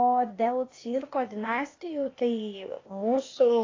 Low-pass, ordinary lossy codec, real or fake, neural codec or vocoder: 7.2 kHz; AAC, 32 kbps; fake; codec, 16 kHz, 0.8 kbps, ZipCodec